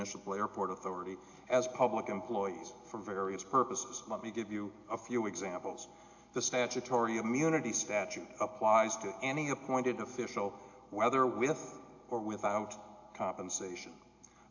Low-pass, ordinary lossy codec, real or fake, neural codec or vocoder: 7.2 kHz; AAC, 48 kbps; real; none